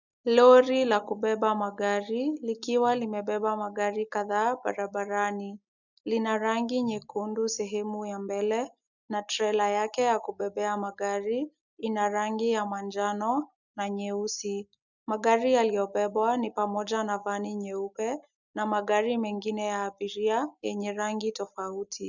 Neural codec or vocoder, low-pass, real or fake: none; 7.2 kHz; real